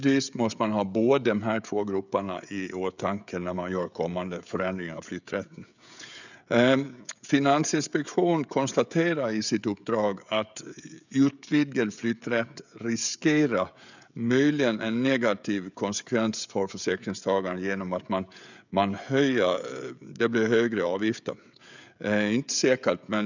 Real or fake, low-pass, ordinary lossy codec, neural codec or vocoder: fake; 7.2 kHz; none; codec, 16 kHz, 16 kbps, FreqCodec, smaller model